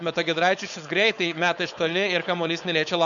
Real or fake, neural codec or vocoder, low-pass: fake; codec, 16 kHz, 4.8 kbps, FACodec; 7.2 kHz